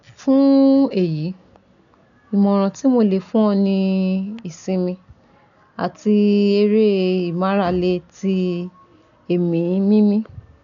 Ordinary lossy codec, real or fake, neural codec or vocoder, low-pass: none; real; none; 7.2 kHz